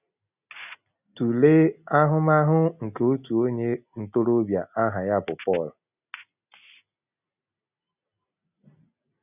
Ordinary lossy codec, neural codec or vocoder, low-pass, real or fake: none; none; 3.6 kHz; real